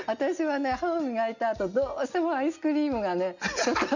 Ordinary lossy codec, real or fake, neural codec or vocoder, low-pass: none; real; none; 7.2 kHz